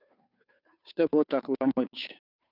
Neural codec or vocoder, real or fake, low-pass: codec, 16 kHz, 2 kbps, FunCodec, trained on Chinese and English, 25 frames a second; fake; 5.4 kHz